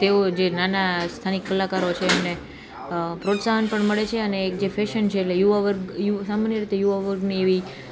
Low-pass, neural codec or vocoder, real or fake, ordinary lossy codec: none; none; real; none